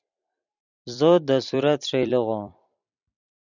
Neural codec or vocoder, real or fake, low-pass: vocoder, 44.1 kHz, 128 mel bands every 256 samples, BigVGAN v2; fake; 7.2 kHz